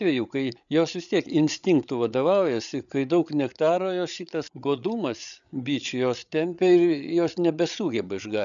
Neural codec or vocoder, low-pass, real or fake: codec, 16 kHz, 8 kbps, FreqCodec, larger model; 7.2 kHz; fake